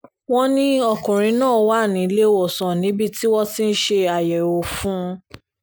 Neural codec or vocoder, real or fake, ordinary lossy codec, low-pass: none; real; none; none